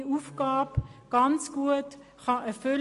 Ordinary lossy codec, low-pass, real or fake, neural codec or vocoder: MP3, 48 kbps; 14.4 kHz; real; none